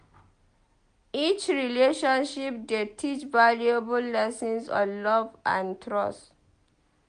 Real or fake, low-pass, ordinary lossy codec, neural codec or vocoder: real; 9.9 kHz; MP3, 64 kbps; none